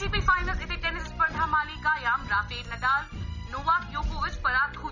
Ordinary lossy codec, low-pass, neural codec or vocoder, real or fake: none; 7.2 kHz; none; real